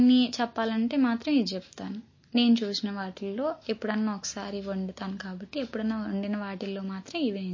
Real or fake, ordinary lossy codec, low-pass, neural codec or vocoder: real; MP3, 32 kbps; 7.2 kHz; none